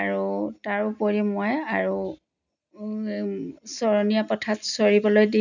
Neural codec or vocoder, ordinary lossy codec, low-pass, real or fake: none; none; 7.2 kHz; real